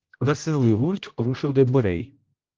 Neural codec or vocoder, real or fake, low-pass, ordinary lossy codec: codec, 16 kHz, 0.5 kbps, X-Codec, HuBERT features, trained on general audio; fake; 7.2 kHz; Opus, 24 kbps